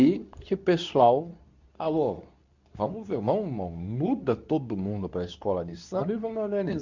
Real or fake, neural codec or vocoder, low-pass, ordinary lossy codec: fake; codec, 24 kHz, 0.9 kbps, WavTokenizer, medium speech release version 2; 7.2 kHz; none